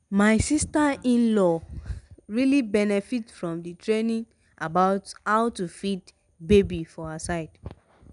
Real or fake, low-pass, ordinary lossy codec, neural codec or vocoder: real; 10.8 kHz; none; none